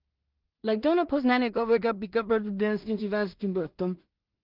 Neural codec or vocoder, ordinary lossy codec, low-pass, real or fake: codec, 16 kHz in and 24 kHz out, 0.4 kbps, LongCat-Audio-Codec, two codebook decoder; Opus, 32 kbps; 5.4 kHz; fake